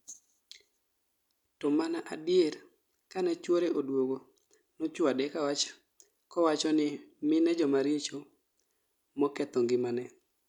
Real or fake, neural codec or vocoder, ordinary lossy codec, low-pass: real; none; none; 19.8 kHz